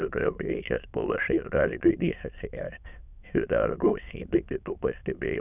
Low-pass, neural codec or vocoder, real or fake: 3.6 kHz; autoencoder, 22.05 kHz, a latent of 192 numbers a frame, VITS, trained on many speakers; fake